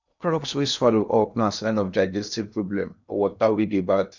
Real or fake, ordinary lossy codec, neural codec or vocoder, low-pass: fake; none; codec, 16 kHz in and 24 kHz out, 0.8 kbps, FocalCodec, streaming, 65536 codes; 7.2 kHz